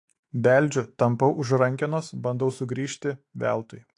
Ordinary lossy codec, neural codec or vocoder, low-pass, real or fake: AAC, 48 kbps; autoencoder, 48 kHz, 128 numbers a frame, DAC-VAE, trained on Japanese speech; 10.8 kHz; fake